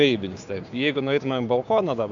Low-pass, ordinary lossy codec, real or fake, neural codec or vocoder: 7.2 kHz; AAC, 64 kbps; fake; codec, 16 kHz, 6 kbps, DAC